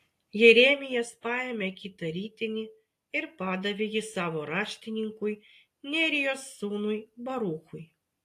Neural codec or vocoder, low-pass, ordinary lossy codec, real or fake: none; 14.4 kHz; AAC, 64 kbps; real